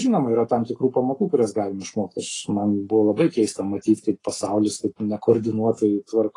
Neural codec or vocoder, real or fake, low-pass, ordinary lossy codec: codec, 44.1 kHz, 7.8 kbps, Pupu-Codec; fake; 10.8 kHz; AAC, 32 kbps